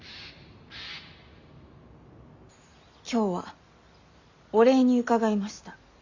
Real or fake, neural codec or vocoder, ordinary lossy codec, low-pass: real; none; Opus, 64 kbps; 7.2 kHz